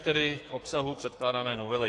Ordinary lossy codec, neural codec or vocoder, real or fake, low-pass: AAC, 48 kbps; codec, 44.1 kHz, 2.6 kbps, SNAC; fake; 10.8 kHz